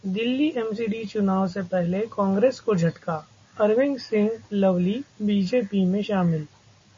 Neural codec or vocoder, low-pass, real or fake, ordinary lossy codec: none; 7.2 kHz; real; MP3, 32 kbps